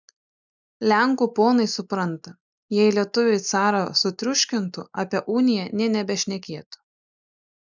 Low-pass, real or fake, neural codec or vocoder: 7.2 kHz; real; none